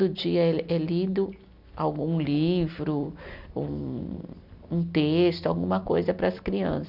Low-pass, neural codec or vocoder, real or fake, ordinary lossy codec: 5.4 kHz; none; real; none